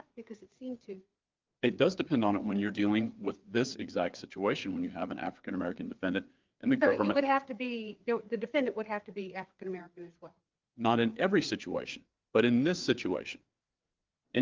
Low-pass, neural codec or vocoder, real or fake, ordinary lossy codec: 7.2 kHz; codec, 16 kHz, 4 kbps, FreqCodec, larger model; fake; Opus, 32 kbps